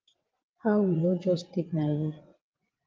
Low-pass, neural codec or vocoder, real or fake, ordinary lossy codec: 7.2 kHz; vocoder, 22.05 kHz, 80 mel bands, WaveNeXt; fake; Opus, 24 kbps